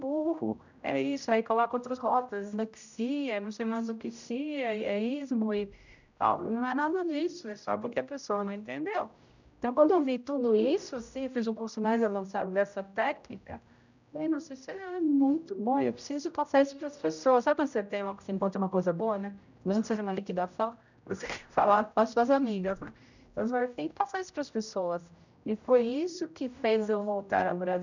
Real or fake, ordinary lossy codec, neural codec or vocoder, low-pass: fake; none; codec, 16 kHz, 0.5 kbps, X-Codec, HuBERT features, trained on general audio; 7.2 kHz